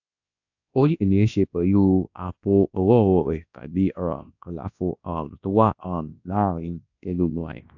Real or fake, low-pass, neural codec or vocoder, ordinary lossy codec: fake; 7.2 kHz; codec, 24 kHz, 0.9 kbps, WavTokenizer, large speech release; AAC, 48 kbps